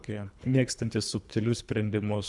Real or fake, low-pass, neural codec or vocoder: fake; 10.8 kHz; codec, 24 kHz, 3 kbps, HILCodec